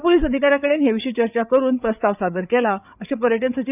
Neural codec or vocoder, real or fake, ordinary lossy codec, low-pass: codec, 16 kHz, 8 kbps, FreqCodec, larger model; fake; none; 3.6 kHz